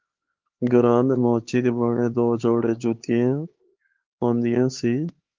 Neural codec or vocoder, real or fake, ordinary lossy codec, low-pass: codec, 16 kHz, 4 kbps, X-Codec, HuBERT features, trained on LibriSpeech; fake; Opus, 16 kbps; 7.2 kHz